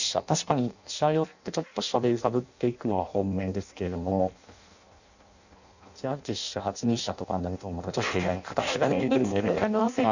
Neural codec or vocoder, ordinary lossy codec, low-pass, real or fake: codec, 16 kHz in and 24 kHz out, 0.6 kbps, FireRedTTS-2 codec; none; 7.2 kHz; fake